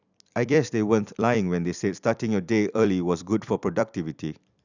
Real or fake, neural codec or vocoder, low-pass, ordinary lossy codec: fake; vocoder, 44.1 kHz, 128 mel bands every 256 samples, BigVGAN v2; 7.2 kHz; none